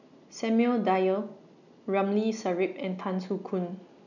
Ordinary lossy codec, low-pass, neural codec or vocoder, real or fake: none; 7.2 kHz; none; real